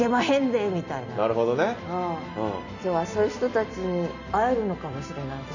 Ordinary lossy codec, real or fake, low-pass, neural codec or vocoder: none; real; 7.2 kHz; none